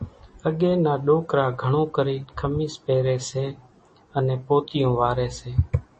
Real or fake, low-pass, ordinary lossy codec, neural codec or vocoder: real; 10.8 kHz; MP3, 32 kbps; none